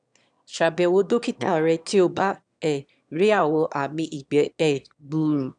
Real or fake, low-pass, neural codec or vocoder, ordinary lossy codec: fake; 9.9 kHz; autoencoder, 22.05 kHz, a latent of 192 numbers a frame, VITS, trained on one speaker; none